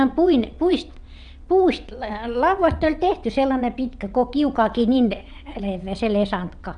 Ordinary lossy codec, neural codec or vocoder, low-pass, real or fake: none; vocoder, 22.05 kHz, 80 mel bands, WaveNeXt; 9.9 kHz; fake